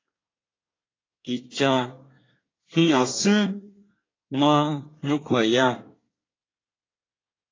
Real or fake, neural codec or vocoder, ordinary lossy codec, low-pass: fake; codec, 24 kHz, 1 kbps, SNAC; AAC, 32 kbps; 7.2 kHz